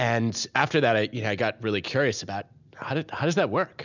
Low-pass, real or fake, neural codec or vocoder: 7.2 kHz; real; none